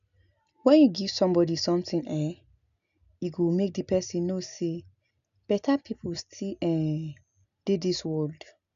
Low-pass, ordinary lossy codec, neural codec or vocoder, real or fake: 7.2 kHz; none; none; real